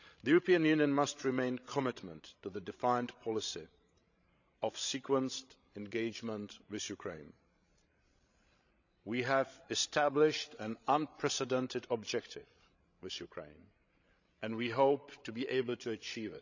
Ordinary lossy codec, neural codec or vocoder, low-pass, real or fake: none; codec, 16 kHz, 16 kbps, FreqCodec, larger model; 7.2 kHz; fake